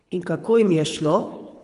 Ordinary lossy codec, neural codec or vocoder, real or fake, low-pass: none; codec, 24 kHz, 3 kbps, HILCodec; fake; 10.8 kHz